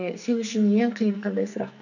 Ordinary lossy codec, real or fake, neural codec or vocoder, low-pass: none; fake; codec, 44.1 kHz, 2.6 kbps, SNAC; 7.2 kHz